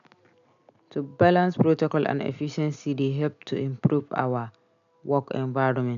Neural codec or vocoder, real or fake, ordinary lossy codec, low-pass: none; real; none; 7.2 kHz